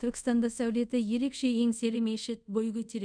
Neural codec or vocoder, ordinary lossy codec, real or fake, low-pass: codec, 24 kHz, 0.5 kbps, DualCodec; none; fake; 9.9 kHz